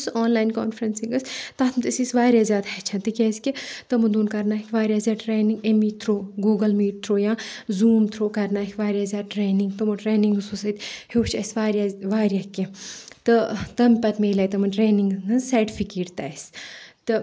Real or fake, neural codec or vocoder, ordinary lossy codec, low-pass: real; none; none; none